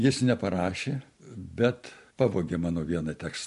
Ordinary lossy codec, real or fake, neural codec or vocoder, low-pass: MP3, 64 kbps; real; none; 10.8 kHz